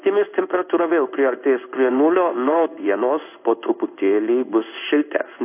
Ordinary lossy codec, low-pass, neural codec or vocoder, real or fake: AAC, 32 kbps; 3.6 kHz; codec, 16 kHz in and 24 kHz out, 1 kbps, XY-Tokenizer; fake